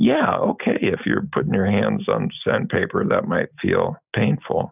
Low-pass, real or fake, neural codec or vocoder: 3.6 kHz; real; none